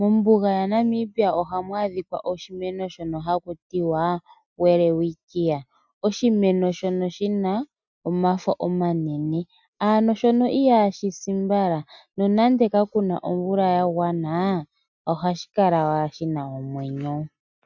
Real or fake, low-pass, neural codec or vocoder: real; 7.2 kHz; none